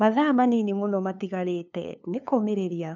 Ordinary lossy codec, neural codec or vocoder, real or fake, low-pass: none; codec, 16 kHz, 2 kbps, FunCodec, trained on LibriTTS, 25 frames a second; fake; 7.2 kHz